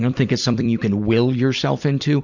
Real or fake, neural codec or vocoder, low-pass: fake; vocoder, 44.1 kHz, 128 mel bands every 256 samples, BigVGAN v2; 7.2 kHz